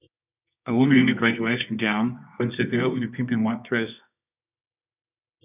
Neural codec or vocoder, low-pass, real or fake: codec, 24 kHz, 0.9 kbps, WavTokenizer, medium music audio release; 3.6 kHz; fake